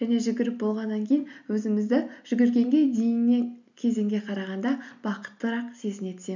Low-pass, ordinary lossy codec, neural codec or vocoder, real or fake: 7.2 kHz; none; none; real